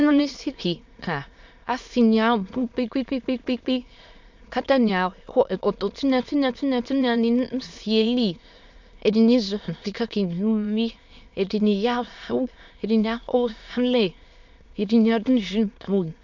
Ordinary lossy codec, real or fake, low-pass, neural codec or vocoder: MP3, 64 kbps; fake; 7.2 kHz; autoencoder, 22.05 kHz, a latent of 192 numbers a frame, VITS, trained on many speakers